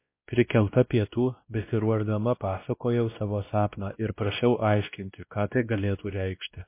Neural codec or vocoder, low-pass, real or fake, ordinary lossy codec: codec, 16 kHz, 1 kbps, X-Codec, WavLM features, trained on Multilingual LibriSpeech; 3.6 kHz; fake; MP3, 24 kbps